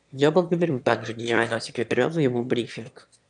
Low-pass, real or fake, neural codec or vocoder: 9.9 kHz; fake; autoencoder, 22.05 kHz, a latent of 192 numbers a frame, VITS, trained on one speaker